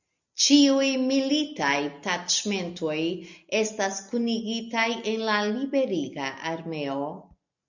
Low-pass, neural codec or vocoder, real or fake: 7.2 kHz; none; real